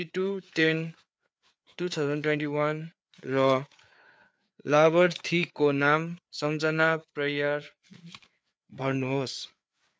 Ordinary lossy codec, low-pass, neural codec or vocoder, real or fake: none; none; codec, 16 kHz, 4 kbps, FreqCodec, larger model; fake